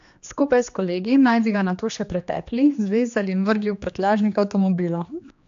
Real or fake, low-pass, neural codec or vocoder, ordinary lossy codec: fake; 7.2 kHz; codec, 16 kHz, 2 kbps, X-Codec, HuBERT features, trained on general audio; AAC, 64 kbps